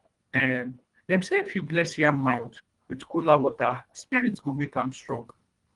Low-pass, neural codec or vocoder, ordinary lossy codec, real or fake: 10.8 kHz; codec, 24 kHz, 1.5 kbps, HILCodec; Opus, 32 kbps; fake